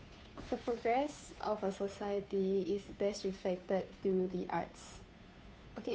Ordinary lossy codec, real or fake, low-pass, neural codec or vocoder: none; fake; none; codec, 16 kHz, 2 kbps, FunCodec, trained on Chinese and English, 25 frames a second